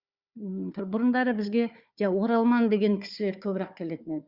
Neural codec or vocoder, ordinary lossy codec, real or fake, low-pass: codec, 16 kHz, 4 kbps, FunCodec, trained on Chinese and English, 50 frames a second; Opus, 64 kbps; fake; 5.4 kHz